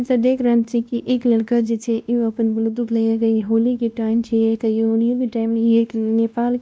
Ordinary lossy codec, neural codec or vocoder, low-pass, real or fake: none; codec, 16 kHz, 1 kbps, X-Codec, WavLM features, trained on Multilingual LibriSpeech; none; fake